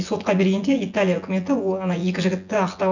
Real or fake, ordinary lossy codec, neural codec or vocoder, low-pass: fake; none; vocoder, 24 kHz, 100 mel bands, Vocos; 7.2 kHz